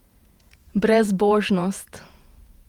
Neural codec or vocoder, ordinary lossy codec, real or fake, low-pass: vocoder, 48 kHz, 128 mel bands, Vocos; Opus, 32 kbps; fake; 19.8 kHz